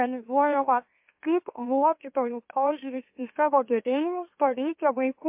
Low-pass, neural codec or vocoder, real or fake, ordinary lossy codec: 3.6 kHz; autoencoder, 44.1 kHz, a latent of 192 numbers a frame, MeloTTS; fake; MP3, 32 kbps